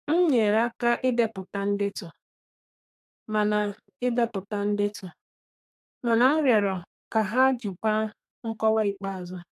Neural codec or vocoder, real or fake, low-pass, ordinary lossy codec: codec, 32 kHz, 1.9 kbps, SNAC; fake; 14.4 kHz; AAC, 96 kbps